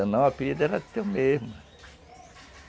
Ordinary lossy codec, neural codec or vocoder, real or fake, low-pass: none; none; real; none